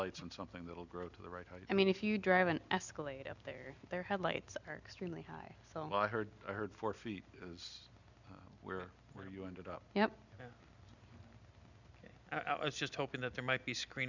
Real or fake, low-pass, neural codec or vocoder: real; 7.2 kHz; none